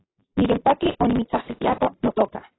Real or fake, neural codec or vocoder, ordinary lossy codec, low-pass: real; none; AAC, 16 kbps; 7.2 kHz